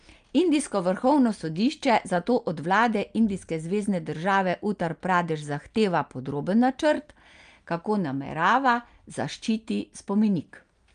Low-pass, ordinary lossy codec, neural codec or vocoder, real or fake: 9.9 kHz; Opus, 32 kbps; none; real